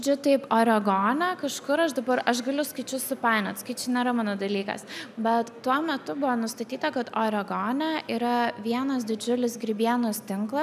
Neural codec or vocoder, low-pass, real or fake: none; 14.4 kHz; real